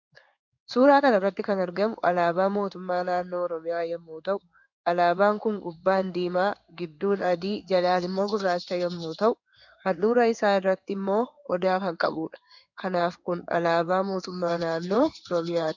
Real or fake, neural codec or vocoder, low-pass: fake; codec, 16 kHz in and 24 kHz out, 1 kbps, XY-Tokenizer; 7.2 kHz